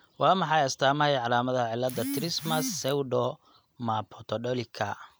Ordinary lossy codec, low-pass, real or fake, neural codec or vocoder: none; none; real; none